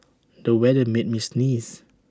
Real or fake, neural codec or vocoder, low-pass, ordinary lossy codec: real; none; none; none